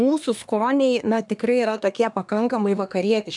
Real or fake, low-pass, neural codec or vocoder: fake; 10.8 kHz; codec, 24 kHz, 1 kbps, SNAC